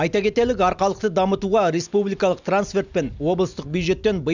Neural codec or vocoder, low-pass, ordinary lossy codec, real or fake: none; 7.2 kHz; none; real